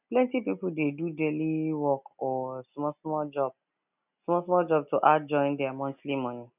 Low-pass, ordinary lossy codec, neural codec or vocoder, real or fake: 3.6 kHz; none; none; real